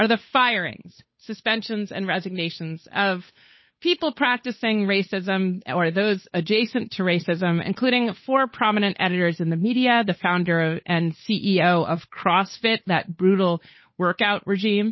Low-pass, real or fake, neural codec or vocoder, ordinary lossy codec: 7.2 kHz; fake; codec, 16 kHz, 8 kbps, FunCodec, trained on Chinese and English, 25 frames a second; MP3, 24 kbps